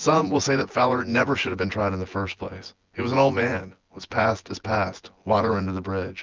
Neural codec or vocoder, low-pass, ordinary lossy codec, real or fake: vocoder, 24 kHz, 100 mel bands, Vocos; 7.2 kHz; Opus, 32 kbps; fake